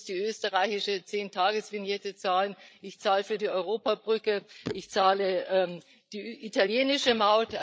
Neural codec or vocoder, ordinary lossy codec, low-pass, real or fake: codec, 16 kHz, 8 kbps, FreqCodec, larger model; none; none; fake